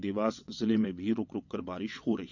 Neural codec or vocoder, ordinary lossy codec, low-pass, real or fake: vocoder, 22.05 kHz, 80 mel bands, WaveNeXt; none; 7.2 kHz; fake